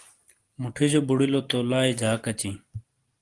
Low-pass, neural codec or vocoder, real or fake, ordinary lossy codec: 10.8 kHz; none; real; Opus, 24 kbps